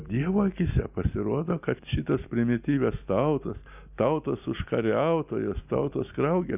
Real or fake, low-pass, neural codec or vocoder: real; 3.6 kHz; none